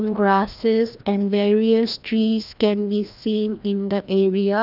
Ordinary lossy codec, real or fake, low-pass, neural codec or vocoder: none; fake; 5.4 kHz; codec, 16 kHz, 1 kbps, FreqCodec, larger model